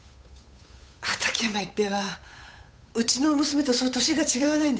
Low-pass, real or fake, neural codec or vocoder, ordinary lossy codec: none; fake; codec, 16 kHz, 8 kbps, FunCodec, trained on Chinese and English, 25 frames a second; none